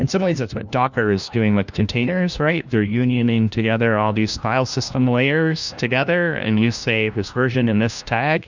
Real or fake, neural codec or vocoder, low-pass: fake; codec, 16 kHz, 1 kbps, FunCodec, trained on LibriTTS, 50 frames a second; 7.2 kHz